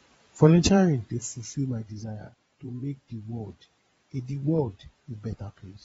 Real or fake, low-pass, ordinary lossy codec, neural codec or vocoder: fake; 9.9 kHz; AAC, 24 kbps; vocoder, 22.05 kHz, 80 mel bands, Vocos